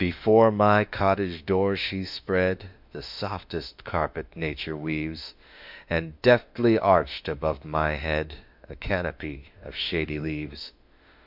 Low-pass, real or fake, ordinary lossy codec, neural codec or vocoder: 5.4 kHz; fake; MP3, 48 kbps; autoencoder, 48 kHz, 32 numbers a frame, DAC-VAE, trained on Japanese speech